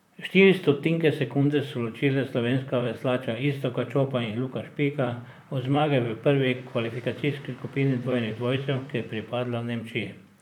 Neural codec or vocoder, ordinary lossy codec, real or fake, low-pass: vocoder, 44.1 kHz, 128 mel bands, Pupu-Vocoder; none; fake; 19.8 kHz